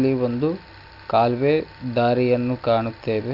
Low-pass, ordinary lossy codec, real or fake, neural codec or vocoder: 5.4 kHz; none; real; none